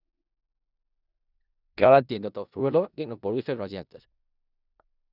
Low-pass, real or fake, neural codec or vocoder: 5.4 kHz; fake; codec, 16 kHz in and 24 kHz out, 0.4 kbps, LongCat-Audio-Codec, four codebook decoder